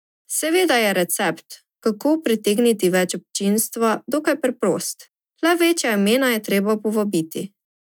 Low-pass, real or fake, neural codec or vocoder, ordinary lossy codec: 19.8 kHz; real; none; none